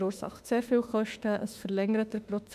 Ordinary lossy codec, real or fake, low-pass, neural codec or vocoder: none; fake; 14.4 kHz; autoencoder, 48 kHz, 32 numbers a frame, DAC-VAE, trained on Japanese speech